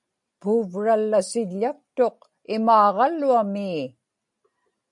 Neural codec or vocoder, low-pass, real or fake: none; 10.8 kHz; real